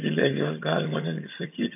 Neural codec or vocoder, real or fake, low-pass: vocoder, 22.05 kHz, 80 mel bands, HiFi-GAN; fake; 3.6 kHz